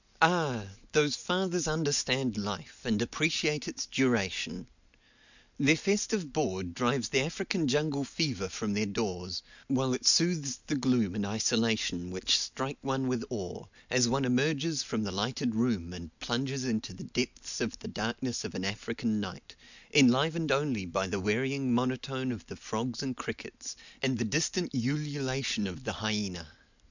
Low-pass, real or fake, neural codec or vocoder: 7.2 kHz; real; none